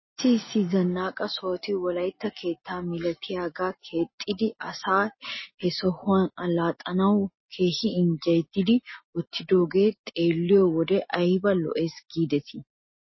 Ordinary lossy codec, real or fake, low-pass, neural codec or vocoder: MP3, 24 kbps; real; 7.2 kHz; none